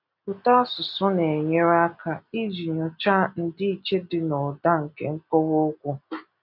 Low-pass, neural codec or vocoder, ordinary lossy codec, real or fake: 5.4 kHz; none; none; real